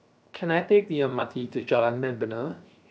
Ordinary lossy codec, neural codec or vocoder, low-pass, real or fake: none; codec, 16 kHz, 0.7 kbps, FocalCodec; none; fake